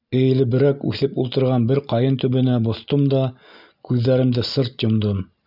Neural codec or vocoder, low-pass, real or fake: none; 5.4 kHz; real